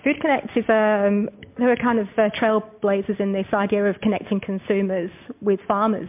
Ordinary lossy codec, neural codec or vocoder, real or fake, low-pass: MP3, 32 kbps; none; real; 3.6 kHz